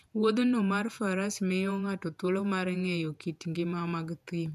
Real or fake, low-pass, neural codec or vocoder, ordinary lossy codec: fake; 14.4 kHz; vocoder, 48 kHz, 128 mel bands, Vocos; none